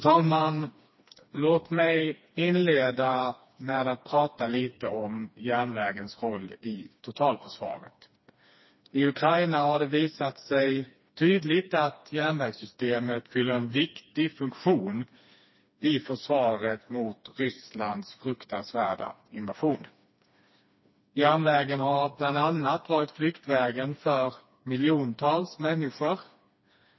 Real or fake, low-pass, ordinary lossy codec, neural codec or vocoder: fake; 7.2 kHz; MP3, 24 kbps; codec, 16 kHz, 2 kbps, FreqCodec, smaller model